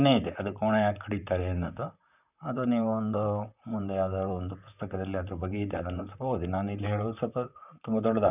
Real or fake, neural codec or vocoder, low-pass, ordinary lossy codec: fake; vocoder, 44.1 kHz, 128 mel bands, Pupu-Vocoder; 3.6 kHz; none